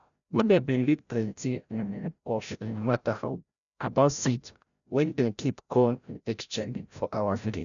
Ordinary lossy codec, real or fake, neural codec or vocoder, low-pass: none; fake; codec, 16 kHz, 0.5 kbps, FreqCodec, larger model; 7.2 kHz